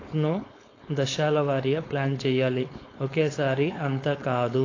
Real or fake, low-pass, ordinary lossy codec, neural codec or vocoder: fake; 7.2 kHz; AAC, 32 kbps; codec, 16 kHz, 4.8 kbps, FACodec